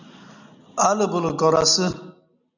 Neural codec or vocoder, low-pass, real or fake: none; 7.2 kHz; real